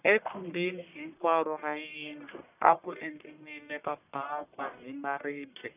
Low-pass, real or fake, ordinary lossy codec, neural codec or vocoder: 3.6 kHz; fake; none; codec, 44.1 kHz, 1.7 kbps, Pupu-Codec